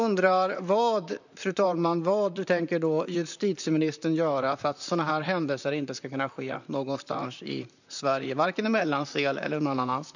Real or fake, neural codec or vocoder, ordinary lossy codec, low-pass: fake; vocoder, 44.1 kHz, 128 mel bands, Pupu-Vocoder; none; 7.2 kHz